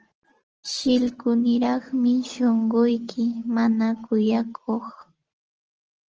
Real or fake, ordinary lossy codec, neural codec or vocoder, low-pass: real; Opus, 16 kbps; none; 7.2 kHz